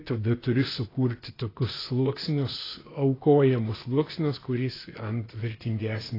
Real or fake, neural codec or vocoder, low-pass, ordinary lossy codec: fake; codec, 16 kHz, 0.8 kbps, ZipCodec; 5.4 kHz; AAC, 24 kbps